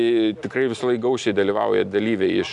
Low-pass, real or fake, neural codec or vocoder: 10.8 kHz; real; none